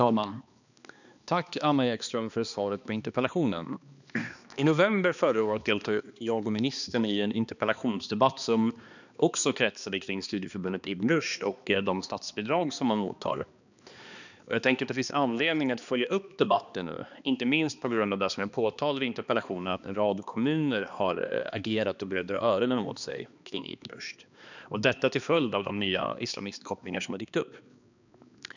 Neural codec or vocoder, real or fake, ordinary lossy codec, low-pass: codec, 16 kHz, 2 kbps, X-Codec, HuBERT features, trained on balanced general audio; fake; none; 7.2 kHz